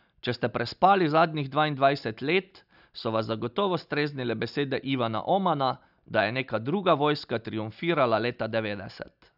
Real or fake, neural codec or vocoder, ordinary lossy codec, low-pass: real; none; none; 5.4 kHz